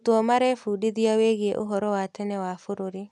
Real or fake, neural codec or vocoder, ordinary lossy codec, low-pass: real; none; none; none